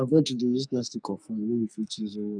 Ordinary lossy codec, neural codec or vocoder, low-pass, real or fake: none; codec, 44.1 kHz, 2.6 kbps, SNAC; 9.9 kHz; fake